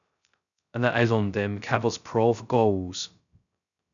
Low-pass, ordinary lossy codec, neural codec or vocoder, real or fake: 7.2 kHz; MP3, 96 kbps; codec, 16 kHz, 0.2 kbps, FocalCodec; fake